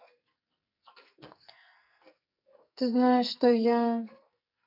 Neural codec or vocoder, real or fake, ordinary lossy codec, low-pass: codec, 44.1 kHz, 2.6 kbps, SNAC; fake; none; 5.4 kHz